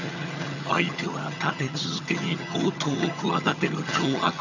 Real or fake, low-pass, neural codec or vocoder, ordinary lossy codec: fake; 7.2 kHz; vocoder, 22.05 kHz, 80 mel bands, HiFi-GAN; none